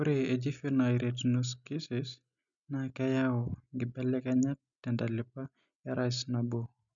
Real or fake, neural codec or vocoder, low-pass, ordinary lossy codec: real; none; 7.2 kHz; none